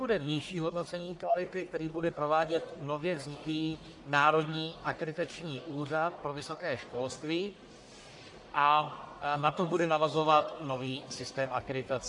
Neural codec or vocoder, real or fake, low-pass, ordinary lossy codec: codec, 44.1 kHz, 1.7 kbps, Pupu-Codec; fake; 10.8 kHz; AAC, 64 kbps